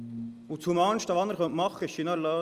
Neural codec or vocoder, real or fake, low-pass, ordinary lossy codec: none; real; 14.4 kHz; Opus, 32 kbps